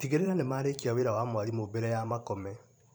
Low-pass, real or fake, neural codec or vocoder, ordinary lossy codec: none; fake; vocoder, 44.1 kHz, 128 mel bands every 512 samples, BigVGAN v2; none